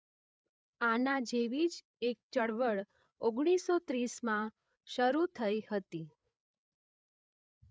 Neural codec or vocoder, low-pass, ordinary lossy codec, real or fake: codec, 16 kHz, 8 kbps, FreqCodec, larger model; none; none; fake